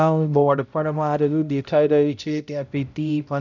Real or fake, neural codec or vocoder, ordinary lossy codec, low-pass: fake; codec, 16 kHz, 0.5 kbps, X-Codec, HuBERT features, trained on balanced general audio; none; 7.2 kHz